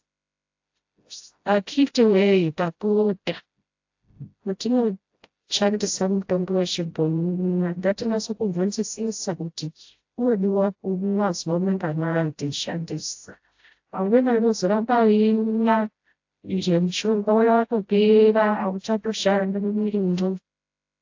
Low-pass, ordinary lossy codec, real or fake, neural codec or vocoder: 7.2 kHz; AAC, 48 kbps; fake; codec, 16 kHz, 0.5 kbps, FreqCodec, smaller model